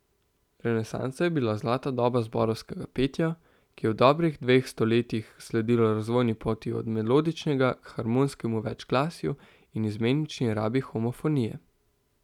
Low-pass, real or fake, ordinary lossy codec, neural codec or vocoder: 19.8 kHz; real; none; none